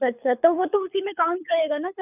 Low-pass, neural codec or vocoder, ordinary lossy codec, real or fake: 3.6 kHz; codec, 24 kHz, 6 kbps, HILCodec; none; fake